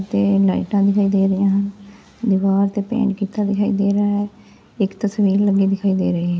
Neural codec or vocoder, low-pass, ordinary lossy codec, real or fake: none; none; none; real